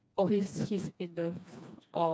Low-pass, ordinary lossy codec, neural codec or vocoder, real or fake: none; none; codec, 16 kHz, 2 kbps, FreqCodec, smaller model; fake